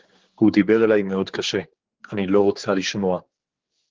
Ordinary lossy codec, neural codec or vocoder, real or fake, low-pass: Opus, 16 kbps; codec, 16 kHz, 4 kbps, X-Codec, HuBERT features, trained on general audio; fake; 7.2 kHz